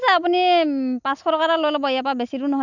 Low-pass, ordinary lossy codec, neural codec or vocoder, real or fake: 7.2 kHz; none; none; real